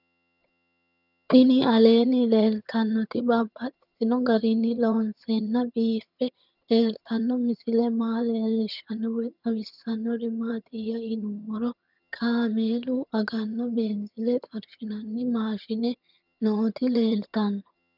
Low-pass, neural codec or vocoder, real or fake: 5.4 kHz; vocoder, 22.05 kHz, 80 mel bands, HiFi-GAN; fake